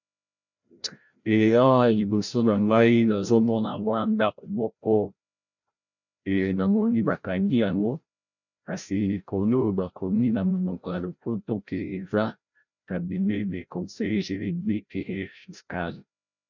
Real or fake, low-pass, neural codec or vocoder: fake; 7.2 kHz; codec, 16 kHz, 0.5 kbps, FreqCodec, larger model